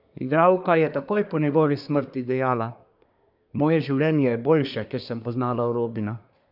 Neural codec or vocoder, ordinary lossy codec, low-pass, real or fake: codec, 24 kHz, 1 kbps, SNAC; none; 5.4 kHz; fake